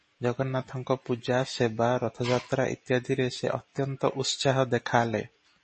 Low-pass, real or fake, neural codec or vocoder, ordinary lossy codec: 10.8 kHz; real; none; MP3, 32 kbps